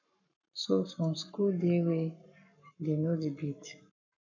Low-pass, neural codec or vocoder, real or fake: 7.2 kHz; codec, 44.1 kHz, 7.8 kbps, Pupu-Codec; fake